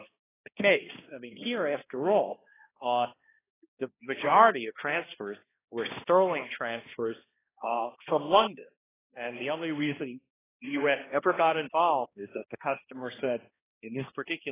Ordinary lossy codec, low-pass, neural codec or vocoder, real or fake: AAC, 16 kbps; 3.6 kHz; codec, 16 kHz, 1 kbps, X-Codec, HuBERT features, trained on balanced general audio; fake